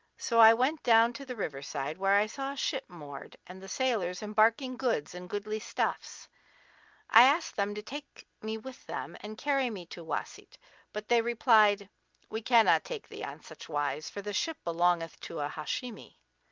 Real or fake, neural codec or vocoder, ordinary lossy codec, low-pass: real; none; Opus, 24 kbps; 7.2 kHz